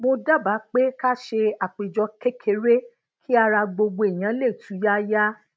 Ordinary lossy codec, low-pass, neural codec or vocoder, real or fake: none; none; none; real